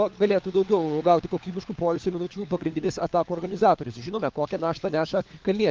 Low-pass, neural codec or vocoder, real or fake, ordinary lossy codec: 7.2 kHz; codec, 16 kHz, 4 kbps, FunCodec, trained on LibriTTS, 50 frames a second; fake; Opus, 24 kbps